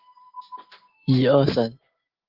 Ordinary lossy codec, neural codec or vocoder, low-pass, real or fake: Opus, 16 kbps; none; 5.4 kHz; real